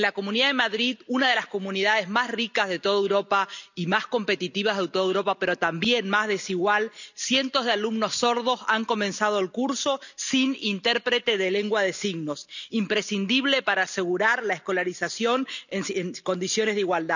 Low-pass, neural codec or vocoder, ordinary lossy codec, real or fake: 7.2 kHz; none; none; real